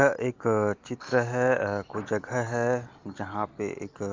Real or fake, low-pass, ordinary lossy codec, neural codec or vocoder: real; 7.2 kHz; Opus, 32 kbps; none